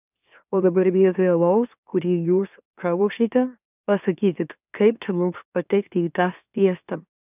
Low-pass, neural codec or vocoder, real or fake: 3.6 kHz; autoencoder, 44.1 kHz, a latent of 192 numbers a frame, MeloTTS; fake